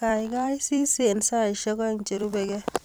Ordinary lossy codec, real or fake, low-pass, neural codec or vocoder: none; fake; none; vocoder, 44.1 kHz, 128 mel bands every 256 samples, BigVGAN v2